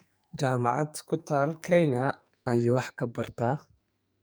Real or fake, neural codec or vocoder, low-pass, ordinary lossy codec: fake; codec, 44.1 kHz, 2.6 kbps, SNAC; none; none